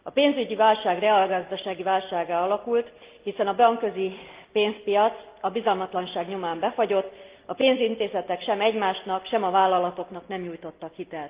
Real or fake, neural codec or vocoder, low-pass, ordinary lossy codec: real; none; 3.6 kHz; Opus, 32 kbps